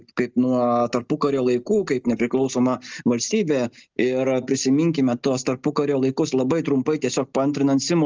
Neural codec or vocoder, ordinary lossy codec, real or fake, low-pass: none; Opus, 24 kbps; real; 7.2 kHz